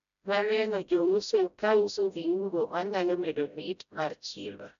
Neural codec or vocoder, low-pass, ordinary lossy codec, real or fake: codec, 16 kHz, 0.5 kbps, FreqCodec, smaller model; 7.2 kHz; none; fake